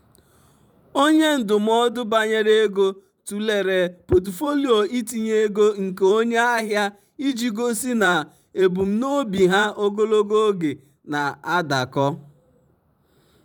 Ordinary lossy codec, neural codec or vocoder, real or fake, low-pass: none; vocoder, 44.1 kHz, 128 mel bands every 512 samples, BigVGAN v2; fake; 19.8 kHz